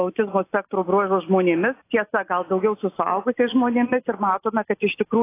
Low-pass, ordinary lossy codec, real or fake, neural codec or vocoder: 3.6 kHz; AAC, 24 kbps; real; none